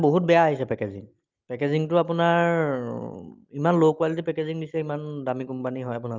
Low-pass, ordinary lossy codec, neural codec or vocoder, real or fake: 7.2 kHz; Opus, 24 kbps; none; real